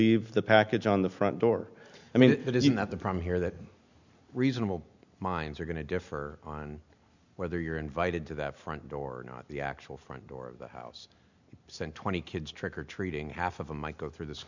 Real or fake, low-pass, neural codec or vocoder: real; 7.2 kHz; none